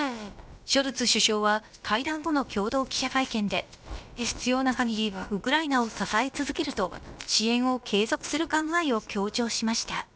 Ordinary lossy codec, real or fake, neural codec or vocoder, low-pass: none; fake; codec, 16 kHz, about 1 kbps, DyCAST, with the encoder's durations; none